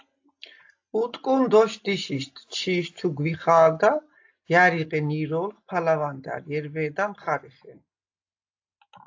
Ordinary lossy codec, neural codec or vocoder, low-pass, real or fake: AAC, 48 kbps; none; 7.2 kHz; real